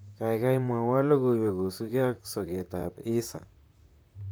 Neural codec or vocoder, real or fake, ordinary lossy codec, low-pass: vocoder, 44.1 kHz, 128 mel bands, Pupu-Vocoder; fake; none; none